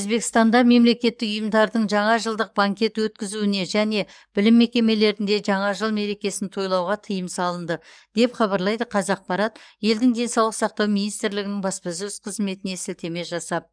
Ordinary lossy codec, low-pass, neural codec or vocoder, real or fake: none; 9.9 kHz; codec, 44.1 kHz, 7.8 kbps, DAC; fake